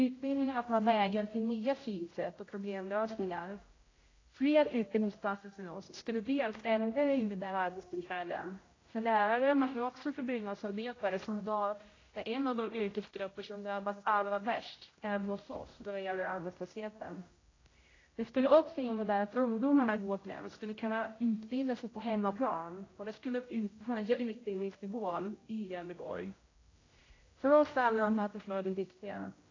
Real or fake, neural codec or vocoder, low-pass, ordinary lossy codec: fake; codec, 16 kHz, 0.5 kbps, X-Codec, HuBERT features, trained on general audio; 7.2 kHz; AAC, 32 kbps